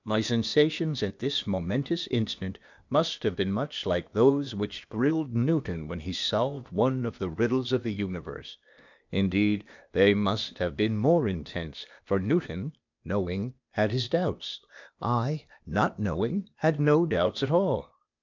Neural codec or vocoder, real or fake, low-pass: codec, 16 kHz, 0.8 kbps, ZipCodec; fake; 7.2 kHz